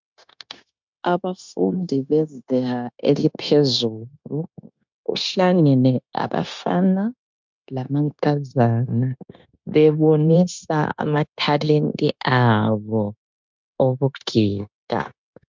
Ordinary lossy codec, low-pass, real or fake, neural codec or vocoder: MP3, 64 kbps; 7.2 kHz; fake; codec, 16 kHz, 0.9 kbps, LongCat-Audio-Codec